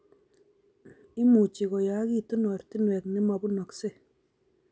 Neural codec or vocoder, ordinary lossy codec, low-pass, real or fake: none; none; none; real